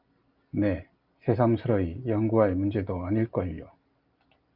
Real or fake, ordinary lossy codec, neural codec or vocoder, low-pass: real; Opus, 32 kbps; none; 5.4 kHz